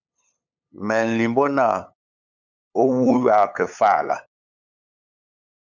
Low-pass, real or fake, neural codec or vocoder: 7.2 kHz; fake; codec, 16 kHz, 8 kbps, FunCodec, trained on LibriTTS, 25 frames a second